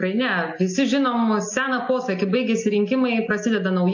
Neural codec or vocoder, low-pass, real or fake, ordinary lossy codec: none; 7.2 kHz; real; AAC, 48 kbps